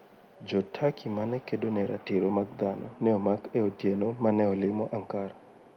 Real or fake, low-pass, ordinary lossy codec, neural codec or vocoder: real; 19.8 kHz; Opus, 32 kbps; none